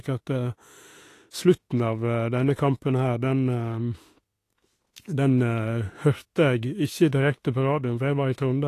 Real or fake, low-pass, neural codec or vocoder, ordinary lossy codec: fake; 14.4 kHz; autoencoder, 48 kHz, 32 numbers a frame, DAC-VAE, trained on Japanese speech; AAC, 48 kbps